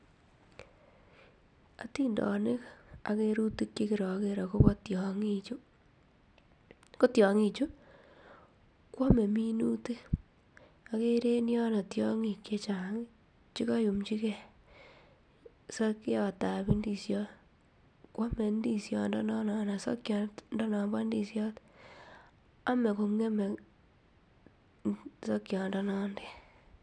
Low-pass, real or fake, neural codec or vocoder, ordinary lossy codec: 9.9 kHz; real; none; none